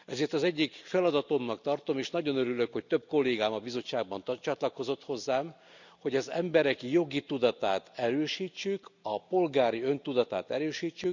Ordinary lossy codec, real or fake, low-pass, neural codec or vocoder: none; real; 7.2 kHz; none